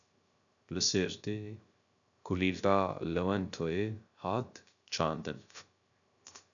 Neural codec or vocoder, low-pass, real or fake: codec, 16 kHz, 0.3 kbps, FocalCodec; 7.2 kHz; fake